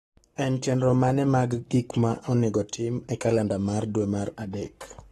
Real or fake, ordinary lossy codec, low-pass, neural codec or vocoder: fake; AAC, 32 kbps; 19.8 kHz; codec, 44.1 kHz, 7.8 kbps, Pupu-Codec